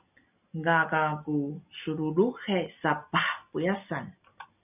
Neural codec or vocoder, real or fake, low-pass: none; real; 3.6 kHz